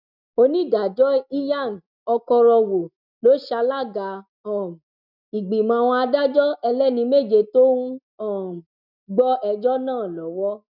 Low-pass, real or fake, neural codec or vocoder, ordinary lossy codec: 5.4 kHz; real; none; none